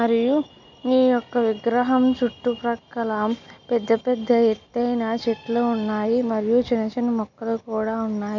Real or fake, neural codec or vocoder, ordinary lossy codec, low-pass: real; none; MP3, 64 kbps; 7.2 kHz